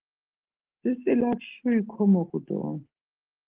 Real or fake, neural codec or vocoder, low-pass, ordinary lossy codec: real; none; 3.6 kHz; Opus, 16 kbps